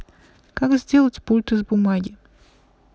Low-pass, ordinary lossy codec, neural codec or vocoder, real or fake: none; none; none; real